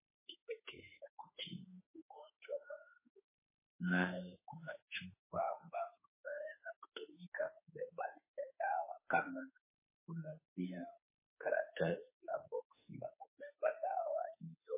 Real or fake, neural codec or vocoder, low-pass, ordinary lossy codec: fake; autoencoder, 48 kHz, 32 numbers a frame, DAC-VAE, trained on Japanese speech; 3.6 kHz; MP3, 16 kbps